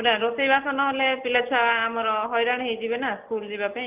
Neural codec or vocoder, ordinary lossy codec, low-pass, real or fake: none; Opus, 24 kbps; 3.6 kHz; real